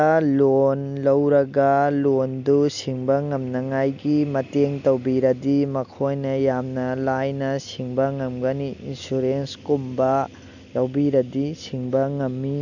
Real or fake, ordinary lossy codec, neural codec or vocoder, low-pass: real; none; none; 7.2 kHz